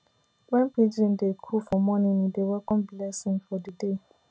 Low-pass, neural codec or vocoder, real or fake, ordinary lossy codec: none; none; real; none